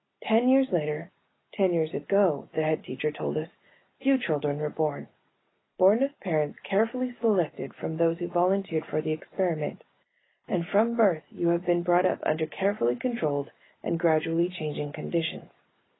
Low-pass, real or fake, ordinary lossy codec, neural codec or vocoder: 7.2 kHz; real; AAC, 16 kbps; none